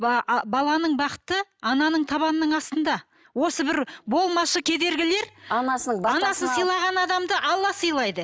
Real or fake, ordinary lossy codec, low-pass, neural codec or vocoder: real; none; none; none